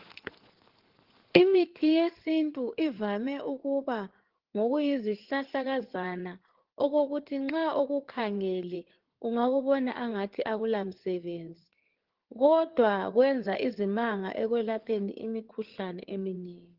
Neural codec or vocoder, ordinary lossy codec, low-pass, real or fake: codec, 16 kHz in and 24 kHz out, 2.2 kbps, FireRedTTS-2 codec; Opus, 16 kbps; 5.4 kHz; fake